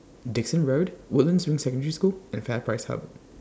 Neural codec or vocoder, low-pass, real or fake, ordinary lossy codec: none; none; real; none